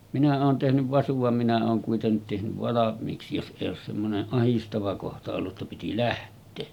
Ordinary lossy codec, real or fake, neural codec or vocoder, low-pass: none; real; none; 19.8 kHz